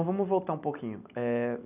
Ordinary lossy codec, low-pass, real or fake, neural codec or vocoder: none; 3.6 kHz; real; none